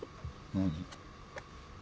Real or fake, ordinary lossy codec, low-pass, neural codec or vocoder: real; none; none; none